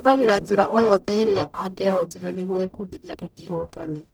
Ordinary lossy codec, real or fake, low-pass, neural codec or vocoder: none; fake; none; codec, 44.1 kHz, 0.9 kbps, DAC